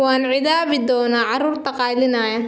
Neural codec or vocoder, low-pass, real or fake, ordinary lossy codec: none; none; real; none